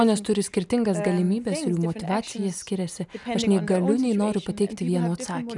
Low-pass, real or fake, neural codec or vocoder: 10.8 kHz; real; none